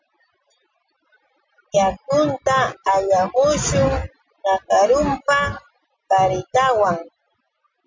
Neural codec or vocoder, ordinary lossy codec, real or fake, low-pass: none; MP3, 64 kbps; real; 7.2 kHz